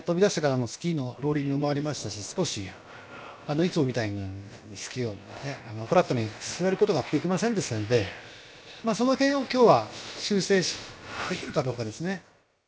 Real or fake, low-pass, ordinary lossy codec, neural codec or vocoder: fake; none; none; codec, 16 kHz, about 1 kbps, DyCAST, with the encoder's durations